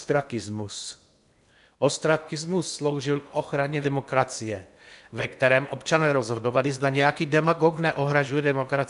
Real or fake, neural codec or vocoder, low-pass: fake; codec, 16 kHz in and 24 kHz out, 0.8 kbps, FocalCodec, streaming, 65536 codes; 10.8 kHz